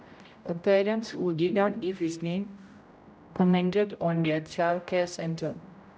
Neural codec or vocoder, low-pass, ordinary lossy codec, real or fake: codec, 16 kHz, 0.5 kbps, X-Codec, HuBERT features, trained on general audio; none; none; fake